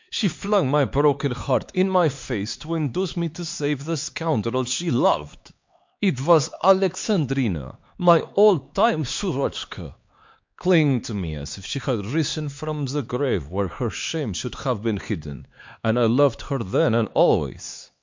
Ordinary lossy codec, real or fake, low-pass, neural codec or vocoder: MP3, 48 kbps; fake; 7.2 kHz; codec, 16 kHz, 4 kbps, X-Codec, HuBERT features, trained on LibriSpeech